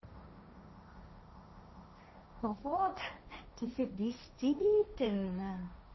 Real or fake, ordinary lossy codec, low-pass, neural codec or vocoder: fake; MP3, 24 kbps; 7.2 kHz; codec, 16 kHz, 1.1 kbps, Voila-Tokenizer